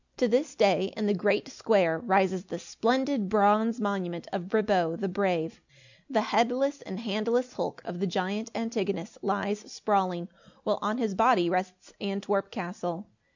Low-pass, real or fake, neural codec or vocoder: 7.2 kHz; real; none